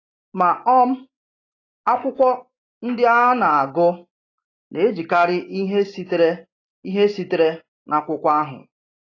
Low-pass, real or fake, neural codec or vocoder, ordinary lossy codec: 7.2 kHz; real; none; AAC, 32 kbps